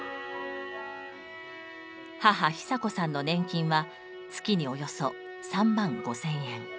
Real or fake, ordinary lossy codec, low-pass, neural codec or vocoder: real; none; none; none